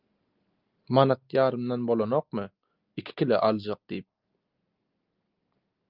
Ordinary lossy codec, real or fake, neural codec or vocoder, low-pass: Opus, 24 kbps; real; none; 5.4 kHz